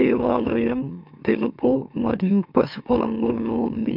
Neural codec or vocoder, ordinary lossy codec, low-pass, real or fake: autoencoder, 44.1 kHz, a latent of 192 numbers a frame, MeloTTS; none; 5.4 kHz; fake